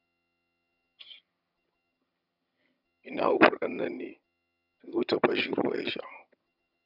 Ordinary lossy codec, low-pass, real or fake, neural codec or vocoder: none; 5.4 kHz; fake; vocoder, 22.05 kHz, 80 mel bands, HiFi-GAN